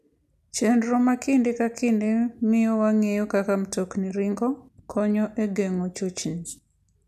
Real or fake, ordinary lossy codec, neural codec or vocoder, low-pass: real; none; none; 14.4 kHz